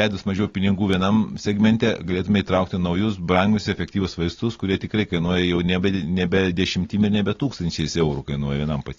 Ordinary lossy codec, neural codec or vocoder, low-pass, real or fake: AAC, 32 kbps; none; 7.2 kHz; real